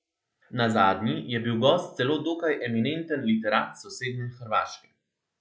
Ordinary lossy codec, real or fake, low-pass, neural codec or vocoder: none; real; none; none